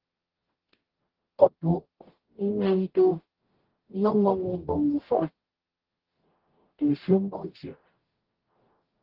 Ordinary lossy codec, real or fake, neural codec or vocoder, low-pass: Opus, 16 kbps; fake; codec, 44.1 kHz, 0.9 kbps, DAC; 5.4 kHz